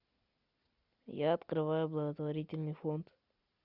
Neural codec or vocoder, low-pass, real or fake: none; 5.4 kHz; real